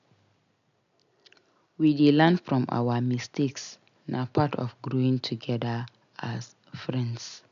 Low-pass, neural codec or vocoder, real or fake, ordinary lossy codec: 7.2 kHz; none; real; none